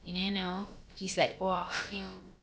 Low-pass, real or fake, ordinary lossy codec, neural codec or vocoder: none; fake; none; codec, 16 kHz, about 1 kbps, DyCAST, with the encoder's durations